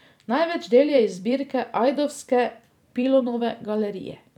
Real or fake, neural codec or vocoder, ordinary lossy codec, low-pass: fake; vocoder, 44.1 kHz, 128 mel bands every 256 samples, BigVGAN v2; none; 19.8 kHz